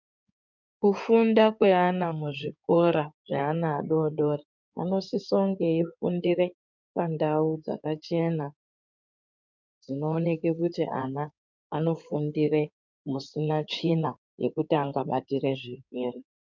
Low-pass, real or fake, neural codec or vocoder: 7.2 kHz; fake; codec, 16 kHz in and 24 kHz out, 2.2 kbps, FireRedTTS-2 codec